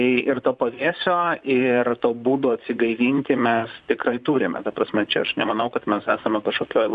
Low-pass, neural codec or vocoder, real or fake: 10.8 kHz; vocoder, 44.1 kHz, 128 mel bands, Pupu-Vocoder; fake